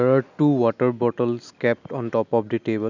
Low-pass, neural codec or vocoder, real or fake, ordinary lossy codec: 7.2 kHz; none; real; none